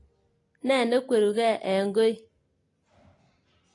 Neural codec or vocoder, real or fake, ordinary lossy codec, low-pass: none; real; AAC, 32 kbps; 10.8 kHz